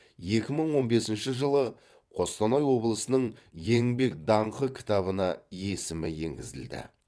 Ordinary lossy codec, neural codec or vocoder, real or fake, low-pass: none; vocoder, 22.05 kHz, 80 mel bands, WaveNeXt; fake; none